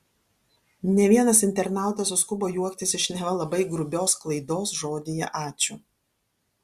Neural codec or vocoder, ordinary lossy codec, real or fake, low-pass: none; Opus, 64 kbps; real; 14.4 kHz